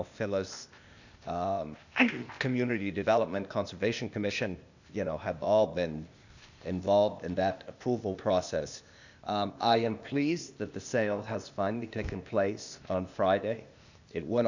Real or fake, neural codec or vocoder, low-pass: fake; codec, 16 kHz, 0.8 kbps, ZipCodec; 7.2 kHz